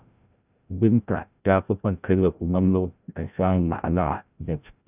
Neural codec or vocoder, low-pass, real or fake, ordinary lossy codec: codec, 16 kHz, 0.5 kbps, FreqCodec, larger model; 3.6 kHz; fake; none